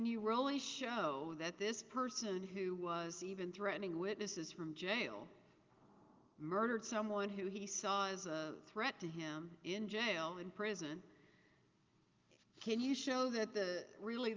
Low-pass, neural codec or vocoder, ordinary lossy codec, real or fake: 7.2 kHz; none; Opus, 32 kbps; real